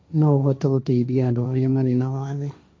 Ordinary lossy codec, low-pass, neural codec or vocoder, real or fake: none; none; codec, 16 kHz, 1.1 kbps, Voila-Tokenizer; fake